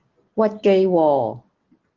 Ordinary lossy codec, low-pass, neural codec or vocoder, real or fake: Opus, 16 kbps; 7.2 kHz; none; real